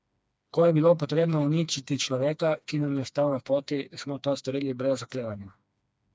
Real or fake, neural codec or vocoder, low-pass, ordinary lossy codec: fake; codec, 16 kHz, 2 kbps, FreqCodec, smaller model; none; none